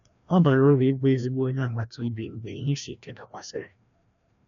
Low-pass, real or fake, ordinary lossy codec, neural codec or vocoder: 7.2 kHz; fake; none; codec, 16 kHz, 1 kbps, FreqCodec, larger model